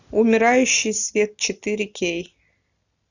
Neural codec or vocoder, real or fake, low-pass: none; real; 7.2 kHz